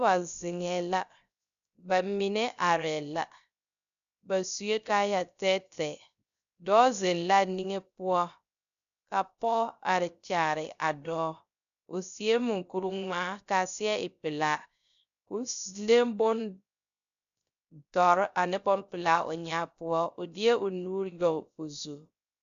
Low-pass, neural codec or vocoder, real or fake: 7.2 kHz; codec, 16 kHz, 0.3 kbps, FocalCodec; fake